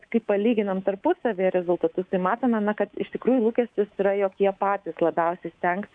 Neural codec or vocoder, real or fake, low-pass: codec, 24 kHz, 3.1 kbps, DualCodec; fake; 9.9 kHz